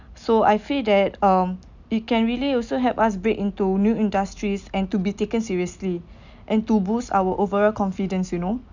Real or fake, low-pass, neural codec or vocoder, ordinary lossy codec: real; 7.2 kHz; none; none